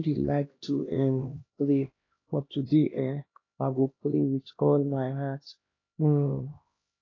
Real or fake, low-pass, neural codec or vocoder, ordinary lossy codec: fake; 7.2 kHz; codec, 16 kHz, 1 kbps, X-Codec, HuBERT features, trained on LibriSpeech; AAC, 32 kbps